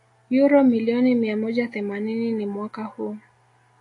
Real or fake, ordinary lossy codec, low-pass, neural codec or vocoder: real; AAC, 48 kbps; 10.8 kHz; none